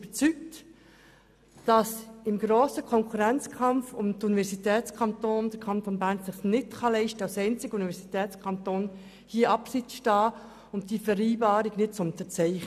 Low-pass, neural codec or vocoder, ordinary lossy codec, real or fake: 14.4 kHz; none; none; real